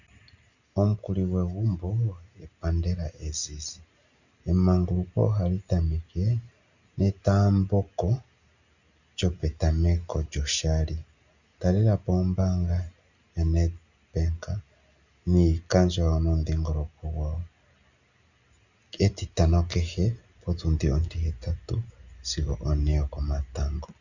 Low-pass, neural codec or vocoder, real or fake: 7.2 kHz; none; real